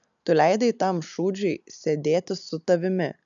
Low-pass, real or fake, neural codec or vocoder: 7.2 kHz; real; none